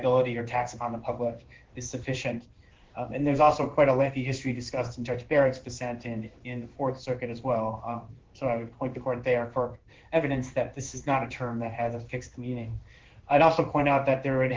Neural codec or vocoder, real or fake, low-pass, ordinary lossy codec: codec, 16 kHz in and 24 kHz out, 1 kbps, XY-Tokenizer; fake; 7.2 kHz; Opus, 16 kbps